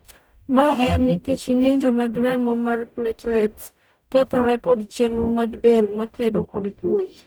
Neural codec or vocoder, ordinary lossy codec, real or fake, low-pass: codec, 44.1 kHz, 0.9 kbps, DAC; none; fake; none